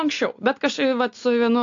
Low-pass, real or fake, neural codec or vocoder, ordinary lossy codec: 7.2 kHz; real; none; AAC, 64 kbps